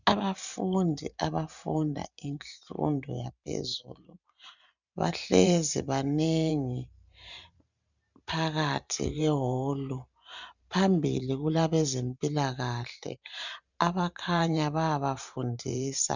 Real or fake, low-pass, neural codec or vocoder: fake; 7.2 kHz; vocoder, 44.1 kHz, 128 mel bands every 512 samples, BigVGAN v2